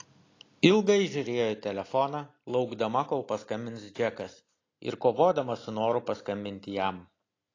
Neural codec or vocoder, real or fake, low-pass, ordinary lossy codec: none; real; 7.2 kHz; AAC, 32 kbps